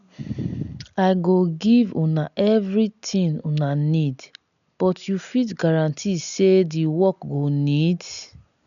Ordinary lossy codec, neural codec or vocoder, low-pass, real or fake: MP3, 96 kbps; none; 7.2 kHz; real